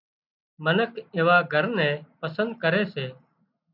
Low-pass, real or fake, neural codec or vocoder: 5.4 kHz; real; none